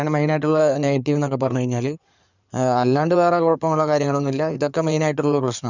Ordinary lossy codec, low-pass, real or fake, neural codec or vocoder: none; 7.2 kHz; fake; codec, 16 kHz in and 24 kHz out, 2.2 kbps, FireRedTTS-2 codec